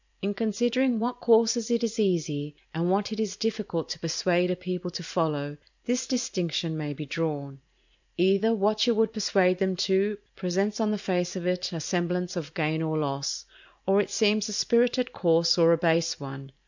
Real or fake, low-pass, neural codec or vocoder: fake; 7.2 kHz; vocoder, 44.1 kHz, 128 mel bands every 512 samples, BigVGAN v2